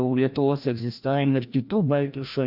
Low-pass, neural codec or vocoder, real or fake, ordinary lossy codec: 5.4 kHz; codec, 16 kHz, 1 kbps, FreqCodec, larger model; fake; AAC, 48 kbps